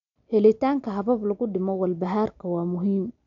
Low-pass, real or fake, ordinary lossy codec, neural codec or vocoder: 7.2 kHz; real; MP3, 64 kbps; none